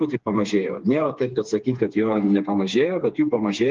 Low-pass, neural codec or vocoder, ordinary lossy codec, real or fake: 7.2 kHz; codec, 16 kHz, 4 kbps, FreqCodec, smaller model; Opus, 32 kbps; fake